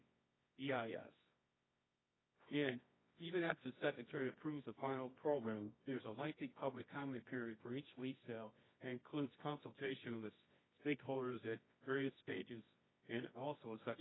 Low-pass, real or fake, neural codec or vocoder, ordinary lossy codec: 7.2 kHz; fake; codec, 24 kHz, 0.9 kbps, WavTokenizer, medium music audio release; AAC, 16 kbps